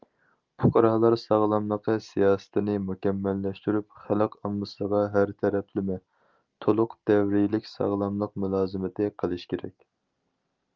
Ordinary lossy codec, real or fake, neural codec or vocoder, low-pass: Opus, 32 kbps; real; none; 7.2 kHz